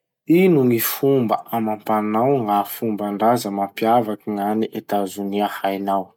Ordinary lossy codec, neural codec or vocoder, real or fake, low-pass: none; none; real; 19.8 kHz